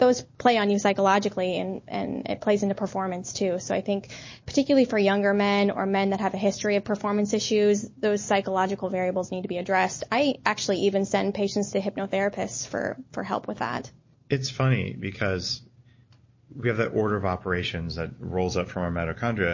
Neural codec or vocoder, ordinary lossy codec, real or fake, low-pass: none; MP3, 32 kbps; real; 7.2 kHz